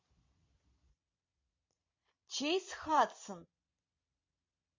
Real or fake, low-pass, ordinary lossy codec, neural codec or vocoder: fake; 7.2 kHz; MP3, 32 kbps; vocoder, 22.05 kHz, 80 mel bands, Vocos